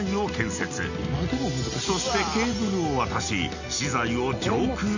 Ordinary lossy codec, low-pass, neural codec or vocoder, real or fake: none; 7.2 kHz; none; real